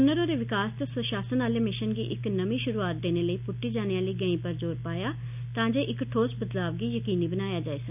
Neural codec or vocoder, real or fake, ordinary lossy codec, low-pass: none; real; none; 3.6 kHz